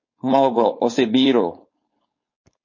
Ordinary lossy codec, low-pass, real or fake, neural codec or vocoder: MP3, 32 kbps; 7.2 kHz; fake; codec, 16 kHz, 4.8 kbps, FACodec